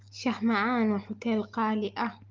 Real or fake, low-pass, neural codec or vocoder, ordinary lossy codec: real; 7.2 kHz; none; Opus, 32 kbps